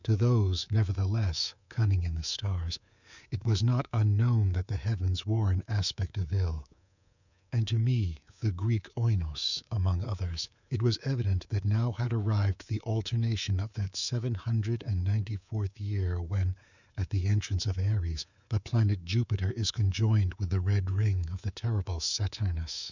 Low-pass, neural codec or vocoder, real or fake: 7.2 kHz; codec, 24 kHz, 3.1 kbps, DualCodec; fake